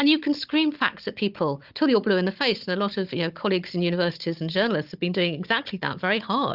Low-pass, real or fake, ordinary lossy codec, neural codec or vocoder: 5.4 kHz; fake; Opus, 24 kbps; codec, 16 kHz, 16 kbps, FunCodec, trained on Chinese and English, 50 frames a second